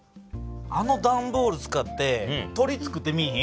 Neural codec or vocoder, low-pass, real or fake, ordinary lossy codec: none; none; real; none